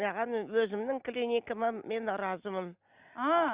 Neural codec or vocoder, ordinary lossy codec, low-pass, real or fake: none; Opus, 64 kbps; 3.6 kHz; real